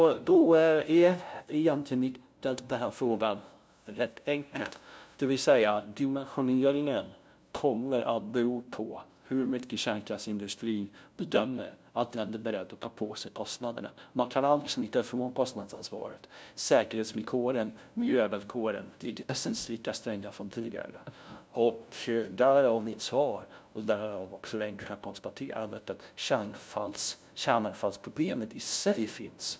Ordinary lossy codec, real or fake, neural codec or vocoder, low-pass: none; fake; codec, 16 kHz, 0.5 kbps, FunCodec, trained on LibriTTS, 25 frames a second; none